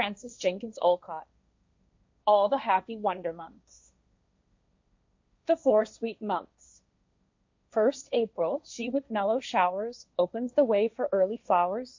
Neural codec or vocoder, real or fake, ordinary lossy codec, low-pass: codec, 16 kHz, 1.1 kbps, Voila-Tokenizer; fake; MP3, 48 kbps; 7.2 kHz